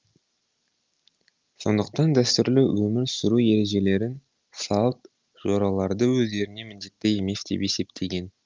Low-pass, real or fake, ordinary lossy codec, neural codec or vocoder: 7.2 kHz; real; Opus, 24 kbps; none